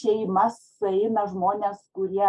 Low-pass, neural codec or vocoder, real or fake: 10.8 kHz; none; real